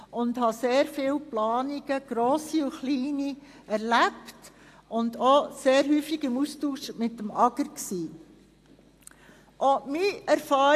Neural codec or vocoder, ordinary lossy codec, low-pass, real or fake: none; AAC, 64 kbps; 14.4 kHz; real